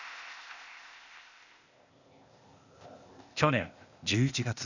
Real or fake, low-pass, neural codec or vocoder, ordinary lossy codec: fake; 7.2 kHz; codec, 16 kHz, 0.8 kbps, ZipCodec; none